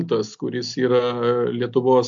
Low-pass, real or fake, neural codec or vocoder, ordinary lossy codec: 7.2 kHz; real; none; AAC, 64 kbps